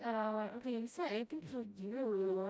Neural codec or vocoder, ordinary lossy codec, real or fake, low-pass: codec, 16 kHz, 0.5 kbps, FreqCodec, smaller model; none; fake; none